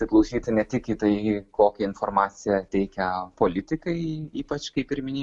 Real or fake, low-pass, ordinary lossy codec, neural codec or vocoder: real; 10.8 kHz; MP3, 96 kbps; none